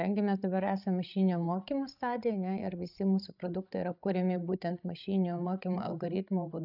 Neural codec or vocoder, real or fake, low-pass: codec, 16 kHz, 16 kbps, FreqCodec, larger model; fake; 5.4 kHz